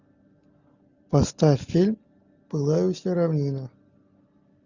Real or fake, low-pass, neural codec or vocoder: real; 7.2 kHz; none